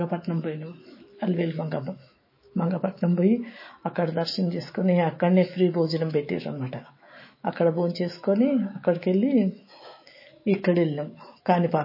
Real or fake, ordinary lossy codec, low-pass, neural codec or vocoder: fake; MP3, 24 kbps; 5.4 kHz; autoencoder, 48 kHz, 128 numbers a frame, DAC-VAE, trained on Japanese speech